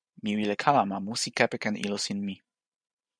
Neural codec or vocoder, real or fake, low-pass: vocoder, 44.1 kHz, 128 mel bands every 512 samples, BigVGAN v2; fake; 9.9 kHz